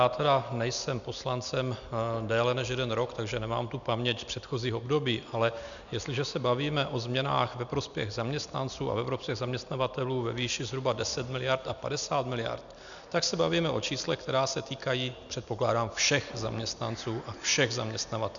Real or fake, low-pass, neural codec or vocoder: real; 7.2 kHz; none